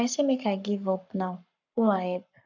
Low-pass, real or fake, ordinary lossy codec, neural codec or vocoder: 7.2 kHz; fake; none; codec, 44.1 kHz, 7.8 kbps, Pupu-Codec